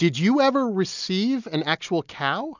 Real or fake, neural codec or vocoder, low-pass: real; none; 7.2 kHz